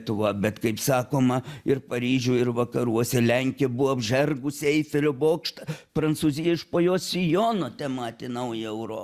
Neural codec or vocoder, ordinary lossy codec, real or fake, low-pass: none; Opus, 64 kbps; real; 14.4 kHz